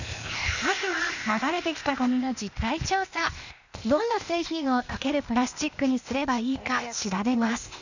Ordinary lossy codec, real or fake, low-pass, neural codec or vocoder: none; fake; 7.2 kHz; codec, 16 kHz, 0.8 kbps, ZipCodec